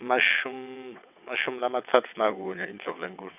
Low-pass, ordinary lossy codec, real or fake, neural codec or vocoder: 3.6 kHz; none; fake; vocoder, 22.05 kHz, 80 mel bands, WaveNeXt